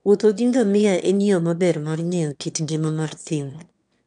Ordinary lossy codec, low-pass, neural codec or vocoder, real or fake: none; 9.9 kHz; autoencoder, 22.05 kHz, a latent of 192 numbers a frame, VITS, trained on one speaker; fake